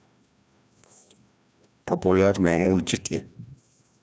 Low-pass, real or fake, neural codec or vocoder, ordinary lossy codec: none; fake; codec, 16 kHz, 1 kbps, FreqCodec, larger model; none